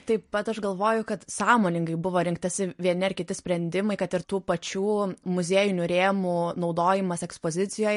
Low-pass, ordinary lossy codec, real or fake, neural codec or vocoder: 10.8 kHz; MP3, 48 kbps; real; none